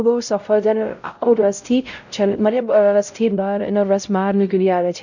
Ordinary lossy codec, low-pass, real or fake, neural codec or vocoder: none; 7.2 kHz; fake; codec, 16 kHz, 0.5 kbps, X-Codec, WavLM features, trained on Multilingual LibriSpeech